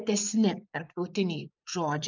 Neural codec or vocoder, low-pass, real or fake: vocoder, 24 kHz, 100 mel bands, Vocos; 7.2 kHz; fake